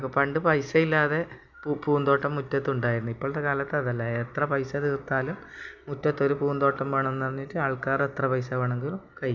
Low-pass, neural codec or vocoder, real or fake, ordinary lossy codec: 7.2 kHz; none; real; none